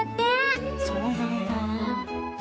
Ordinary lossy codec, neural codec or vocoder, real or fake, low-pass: none; codec, 16 kHz, 4 kbps, X-Codec, HuBERT features, trained on general audio; fake; none